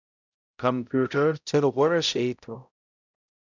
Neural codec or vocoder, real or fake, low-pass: codec, 16 kHz, 0.5 kbps, X-Codec, HuBERT features, trained on balanced general audio; fake; 7.2 kHz